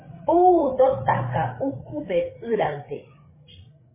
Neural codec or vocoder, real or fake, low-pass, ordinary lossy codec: codec, 16 kHz, 8 kbps, FreqCodec, larger model; fake; 3.6 kHz; MP3, 16 kbps